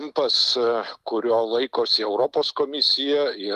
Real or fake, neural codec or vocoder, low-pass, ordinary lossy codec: real; none; 14.4 kHz; Opus, 24 kbps